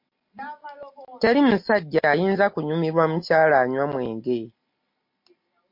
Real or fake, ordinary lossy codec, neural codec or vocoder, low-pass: real; MP3, 32 kbps; none; 5.4 kHz